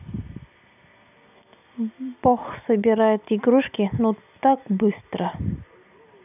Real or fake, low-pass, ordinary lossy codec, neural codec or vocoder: real; 3.6 kHz; none; none